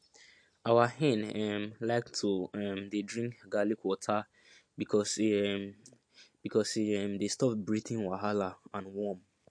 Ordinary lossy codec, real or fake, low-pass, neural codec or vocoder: MP3, 48 kbps; real; 9.9 kHz; none